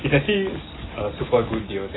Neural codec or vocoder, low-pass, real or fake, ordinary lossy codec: none; 7.2 kHz; real; AAC, 16 kbps